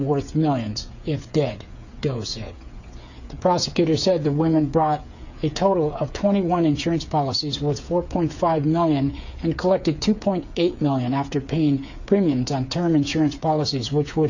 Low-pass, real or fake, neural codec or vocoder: 7.2 kHz; fake; codec, 16 kHz, 8 kbps, FreqCodec, smaller model